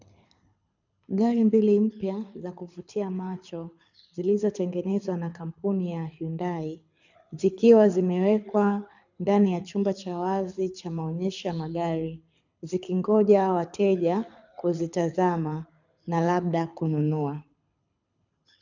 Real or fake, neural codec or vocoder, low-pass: fake; codec, 24 kHz, 6 kbps, HILCodec; 7.2 kHz